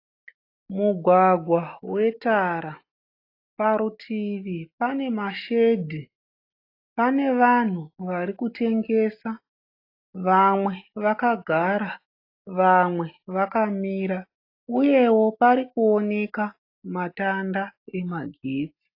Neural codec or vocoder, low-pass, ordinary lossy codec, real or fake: none; 5.4 kHz; AAC, 32 kbps; real